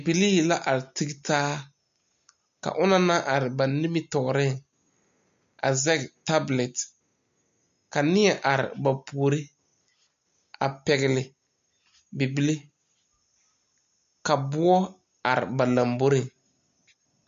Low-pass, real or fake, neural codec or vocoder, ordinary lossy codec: 7.2 kHz; real; none; MP3, 48 kbps